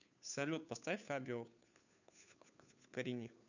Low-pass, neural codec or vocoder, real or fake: 7.2 kHz; codec, 16 kHz, 2 kbps, FunCodec, trained on Chinese and English, 25 frames a second; fake